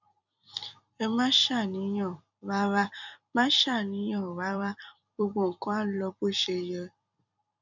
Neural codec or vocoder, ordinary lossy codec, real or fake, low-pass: vocoder, 44.1 kHz, 128 mel bands every 256 samples, BigVGAN v2; none; fake; 7.2 kHz